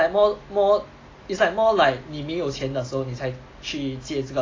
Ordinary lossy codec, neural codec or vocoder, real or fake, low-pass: AAC, 32 kbps; none; real; 7.2 kHz